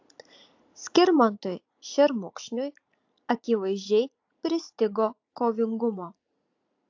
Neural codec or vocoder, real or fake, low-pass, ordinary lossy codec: vocoder, 44.1 kHz, 80 mel bands, Vocos; fake; 7.2 kHz; AAC, 48 kbps